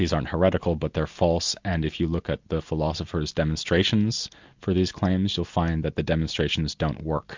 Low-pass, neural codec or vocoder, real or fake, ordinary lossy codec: 7.2 kHz; none; real; MP3, 64 kbps